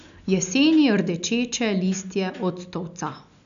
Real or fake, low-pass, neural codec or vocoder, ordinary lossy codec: real; 7.2 kHz; none; none